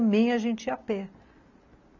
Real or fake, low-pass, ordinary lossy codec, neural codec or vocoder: real; 7.2 kHz; none; none